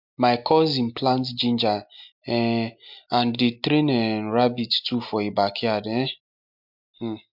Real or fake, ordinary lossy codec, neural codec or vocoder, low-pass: real; MP3, 48 kbps; none; 5.4 kHz